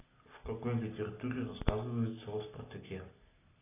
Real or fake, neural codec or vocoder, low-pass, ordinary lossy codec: real; none; 3.6 kHz; AAC, 16 kbps